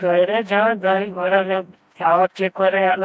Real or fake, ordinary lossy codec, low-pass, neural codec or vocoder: fake; none; none; codec, 16 kHz, 1 kbps, FreqCodec, smaller model